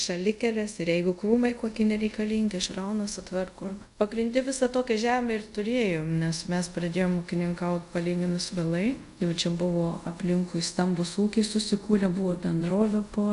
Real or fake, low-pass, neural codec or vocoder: fake; 10.8 kHz; codec, 24 kHz, 0.5 kbps, DualCodec